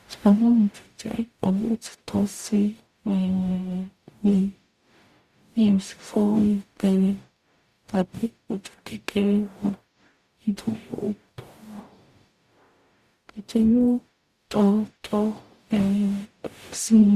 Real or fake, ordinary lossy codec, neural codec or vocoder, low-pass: fake; Opus, 64 kbps; codec, 44.1 kHz, 0.9 kbps, DAC; 14.4 kHz